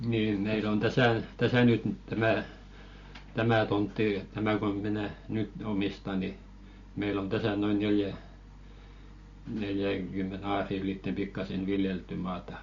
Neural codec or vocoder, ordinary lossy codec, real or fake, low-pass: none; MP3, 48 kbps; real; 7.2 kHz